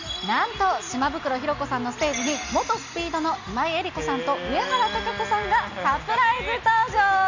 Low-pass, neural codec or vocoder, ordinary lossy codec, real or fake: 7.2 kHz; none; Opus, 64 kbps; real